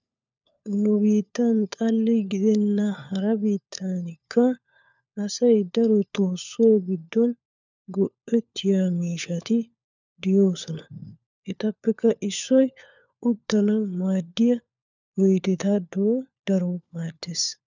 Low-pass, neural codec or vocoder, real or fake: 7.2 kHz; codec, 16 kHz, 4 kbps, FunCodec, trained on LibriTTS, 50 frames a second; fake